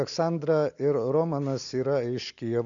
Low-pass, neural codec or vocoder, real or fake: 7.2 kHz; none; real